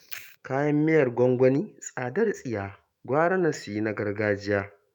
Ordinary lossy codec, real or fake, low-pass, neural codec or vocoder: none; fake; 19.8 kHz; autoencoder, 48 kHz, 128 numbers a frame, DAC-VAE, trained on Japanese speech